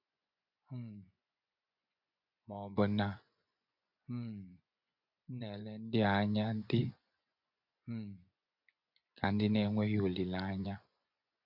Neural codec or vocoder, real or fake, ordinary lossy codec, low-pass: vocoder, 24 kHz, 100 mel bands, Vocos; fake; none; 5.4 kHz